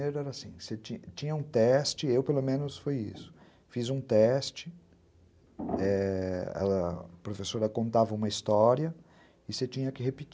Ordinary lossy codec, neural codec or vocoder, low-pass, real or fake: none; none; none; real